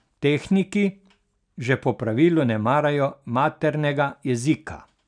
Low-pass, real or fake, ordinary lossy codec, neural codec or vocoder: 9.9 kHz; real; none; none